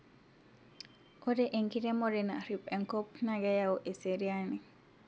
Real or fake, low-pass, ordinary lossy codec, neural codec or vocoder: real; none; none; none